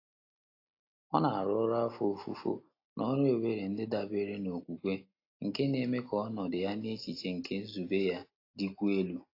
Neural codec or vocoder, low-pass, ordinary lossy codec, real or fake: none; 5.4 kHz; AAC, 32 kbps; real